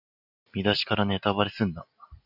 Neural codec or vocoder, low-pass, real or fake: none; 5.4 kHz; real